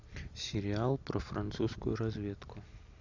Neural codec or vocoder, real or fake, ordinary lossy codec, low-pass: none; real; MP3, 64 kbps; 7.2 kHz